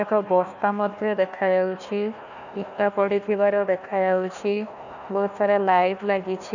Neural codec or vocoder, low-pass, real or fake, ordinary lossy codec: codec, 16 kHz, 1 kbps, FunCodec, trained on Chinese and English, 50 frames a second; 7.2 kHz; fake; none